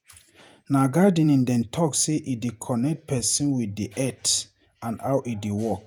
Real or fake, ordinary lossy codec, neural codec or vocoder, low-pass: fake; none; vocoder, 48 kHz, 128 mel bands, Vocos; none